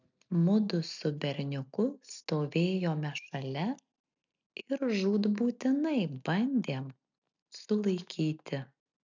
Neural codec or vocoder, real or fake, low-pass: none; real; 7.2 kHz